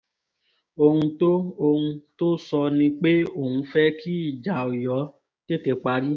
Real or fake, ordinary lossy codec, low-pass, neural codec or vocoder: fake; none; none; codec, 16 kHz, 6 kbps, DAC